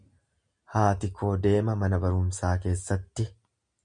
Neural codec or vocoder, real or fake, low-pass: none; real; 9.9 kHz